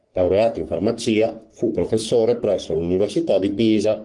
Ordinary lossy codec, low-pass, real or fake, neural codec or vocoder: Opus, 24 kbps; 10.8 kHz; fake; codec, 44.1 kHz, 3.4 kbps, Pupu-Codec